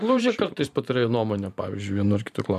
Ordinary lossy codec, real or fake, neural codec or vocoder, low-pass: MP3, 96 kbps; fake; vocoder, 44.1 kHz, 128 mel bands every 512 samples, BigVGAN v2; 14.4 kHz